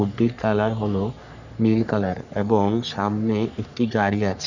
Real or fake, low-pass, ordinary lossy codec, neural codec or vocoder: fake; 7.2 kHz; none; codec, 44.1 kHz, 3.4 kbps, Pupu-Codec